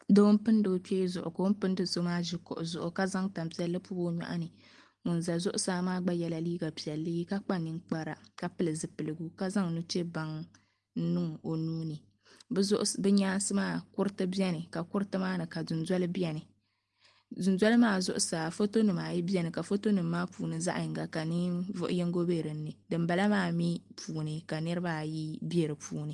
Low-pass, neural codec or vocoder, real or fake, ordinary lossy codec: 10.8 kHz; none; real; Opus, 24 kbps